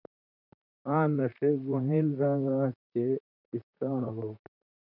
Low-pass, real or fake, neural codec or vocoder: 5.4 kHz; fake; vocoder, 44.1 kHz, 128 mel bands, Pupu-Vocoder